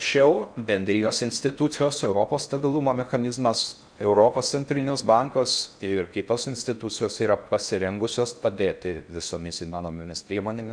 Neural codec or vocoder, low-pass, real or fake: codec, 16 kHz in and 24 kHz out, 0.6 kbps, FocalCodec, streaming, 4096 codes; 9.9 kHz; fake